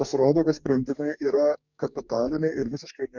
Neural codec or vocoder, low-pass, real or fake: codec, 44.1 kHz, 2.6 kbps, DAC; 7.2 kHz; fake